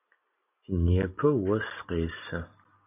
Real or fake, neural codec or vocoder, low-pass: real; none; 3.6 kHz